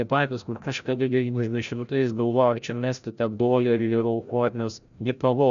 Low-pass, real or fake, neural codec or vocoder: 7.2 kHz; fake; codec, 16 kHz, 0.5 kbps, FreqCodec, larger model